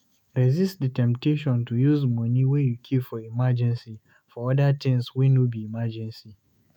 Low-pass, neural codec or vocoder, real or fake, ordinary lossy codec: 19.8 kHz; autoencoder, 48 kHz, 128 numbers a frame, DAC-VAE, trained on Japanese speech; fake; none